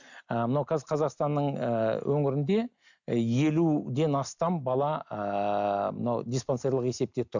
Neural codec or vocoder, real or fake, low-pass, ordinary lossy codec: none; real; 7.2 kHz; none